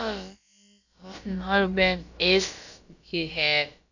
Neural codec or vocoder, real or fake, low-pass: codec, 16 kHz, about 1 kbps, DyCAST, with the encoder's durations; fake; 7.2 kHz